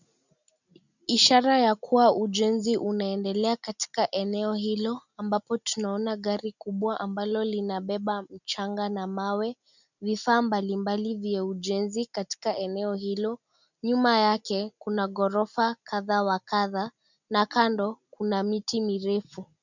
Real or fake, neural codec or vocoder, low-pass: real; none; 7.2 kHz